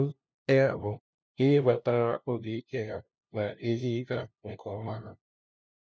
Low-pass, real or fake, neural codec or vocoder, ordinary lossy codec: none; fake; codec, 16 kHz, 0.5 kbps, FunCodec, trained on LibriTTS, 25 frames a second; none